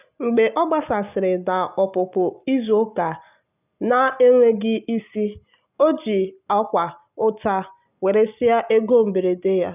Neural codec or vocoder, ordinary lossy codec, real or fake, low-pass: none; none; real; 3.6 kHz